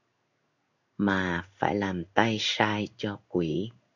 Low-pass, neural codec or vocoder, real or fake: 7.2 kHz; codec, 16 kHz in and 24 kHz out, 1 kbps, XY-Tokenizer; fake